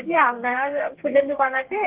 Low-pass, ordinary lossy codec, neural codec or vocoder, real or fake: 3.6 kHz; Opus, 16 kbps; codec, 32 kHz, 1.9 kbps, SNAC; fake